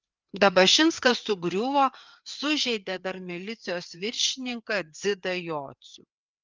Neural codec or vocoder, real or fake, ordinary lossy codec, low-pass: codec, 16 kHz, 4 kbps, FreqCodec, larger model; fake; Opus, 32 kbps; 7.2 kHz